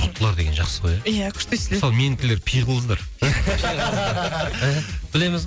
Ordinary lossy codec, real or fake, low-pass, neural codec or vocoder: none; real; none; none